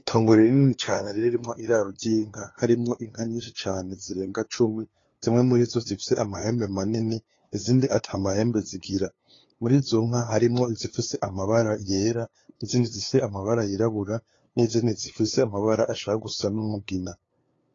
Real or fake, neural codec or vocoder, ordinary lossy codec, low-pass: fake; codec, 16 kHz, 2 kbps, FunCodec, trained on LibriTTS, 25 frames a second; AAC, 32 kbps; 7.2 kHz